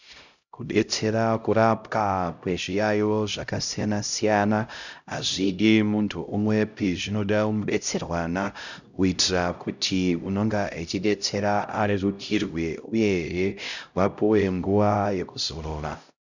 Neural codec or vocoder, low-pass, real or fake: codec, 16 kHz, 0.5 kbps, X-Codec, HuBERT features, trained on LibriSpeech; 7.2 kHz; fake